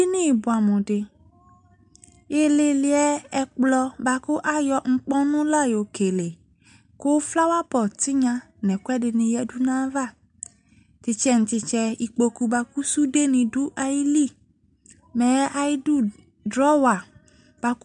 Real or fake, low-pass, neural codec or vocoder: real; 9.9 kHz; none